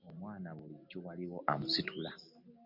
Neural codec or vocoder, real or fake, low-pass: none; real; 5.4 kHz